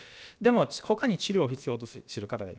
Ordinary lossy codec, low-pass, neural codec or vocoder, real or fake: none; none; codec, 16 kHz, about 1 kbps, DyCAST, with the encoder's durations; fake